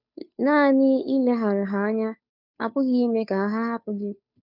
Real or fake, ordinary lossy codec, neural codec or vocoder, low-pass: fake; none; codec, 16 kHz, 2 kbps, FunCodec, trained on Chinese and English, 25 frames a second; 5.4 kHz